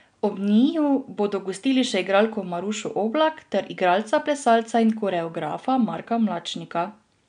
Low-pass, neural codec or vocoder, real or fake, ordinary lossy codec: 9.9 kHz; none; real; none